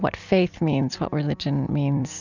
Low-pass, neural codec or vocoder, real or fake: 7.2 kHz; vocoder, 44.1 kHz, 128 mel bands every 256 samples, BigVGAN v2; fake